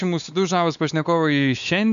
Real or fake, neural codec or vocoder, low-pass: fake; codec, 16 kHz, 4 kbps, X-Codec, WavLM features, trained on Multilingual LibriSpeech; 7.2 kHz